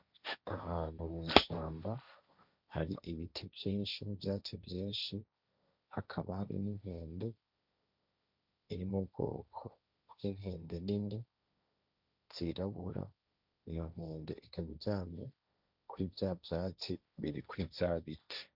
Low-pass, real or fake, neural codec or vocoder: 5.4 kHz; fake; codec, 16 kHz, 1.1 kbps, Voila-Tokenizer